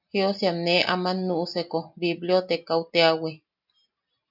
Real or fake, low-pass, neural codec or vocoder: real; 5.4 kHz; none